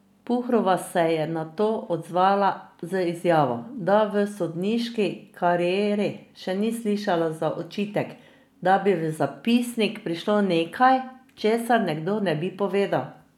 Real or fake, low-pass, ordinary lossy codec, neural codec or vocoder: real; 19.8 kHz; none; none